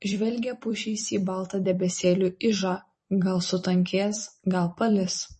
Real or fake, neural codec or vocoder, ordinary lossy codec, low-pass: fake; vocoder, 44.1 kHz, 128 mel bands every 256 samples, BigVGAN v2; MP3, 32 kbps; 10.8 kHz